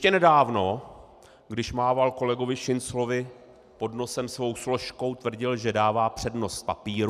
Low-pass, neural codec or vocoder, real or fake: 14.4 kHz; none; real